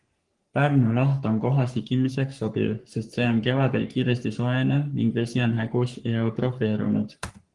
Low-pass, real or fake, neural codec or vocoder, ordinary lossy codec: 10.8 kHz; fake; codec, 44.1 kHz, 3.4 kbps, Pupu-Codec; Opus, 24 kbps